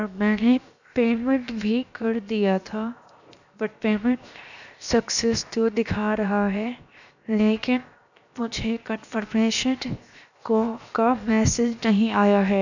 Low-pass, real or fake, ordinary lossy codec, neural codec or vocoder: 7.2 kHz; fake; none; codec, 16 kHz, 0.7 kbps, FocalCodec